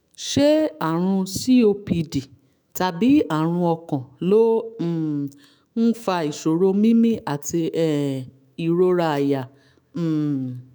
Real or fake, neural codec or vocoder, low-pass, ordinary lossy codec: fake; autoencoder, 48 kHz, 128 numbers a frame, DAC-VAE, trained on Japanese speech; none; none